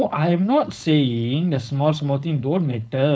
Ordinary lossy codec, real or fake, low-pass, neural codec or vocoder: none; fake; none; codec, 16 kHz, 4.8 kbps, FACodec